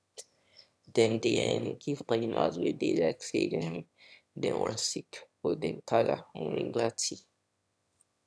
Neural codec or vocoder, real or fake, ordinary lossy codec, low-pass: autoencoder, 22.05 kHz, a latent of 192 numbers a frame, VITS, trained on one speaker; fake; none; none